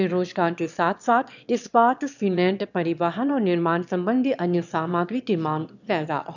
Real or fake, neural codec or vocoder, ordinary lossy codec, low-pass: fake; autoencoder, 22.05 kHz, a latent of 192 numbers a frame, VITS, trained on one speaker; none; 7.2 kHz